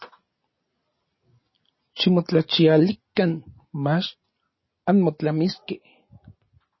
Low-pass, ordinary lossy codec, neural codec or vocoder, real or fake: 7.2 kHz; MP3, 24 kbps; none; real